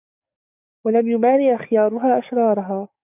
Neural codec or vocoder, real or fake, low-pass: codec, 44.1 kHz, 7.8 kbps, DAC; fake; 3.6 kHz